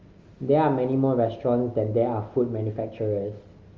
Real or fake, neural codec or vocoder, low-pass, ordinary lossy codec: real; none; 7.2 kHz; Opus, 32 kbps